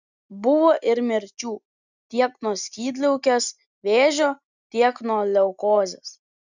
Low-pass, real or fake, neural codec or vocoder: 7.2 kHz; real; none